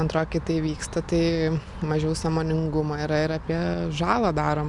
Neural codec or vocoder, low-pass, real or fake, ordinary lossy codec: none; 10.8 kHz; real; MP3, 96 kbps